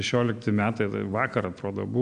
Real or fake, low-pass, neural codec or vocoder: real; 9.9 kHz; none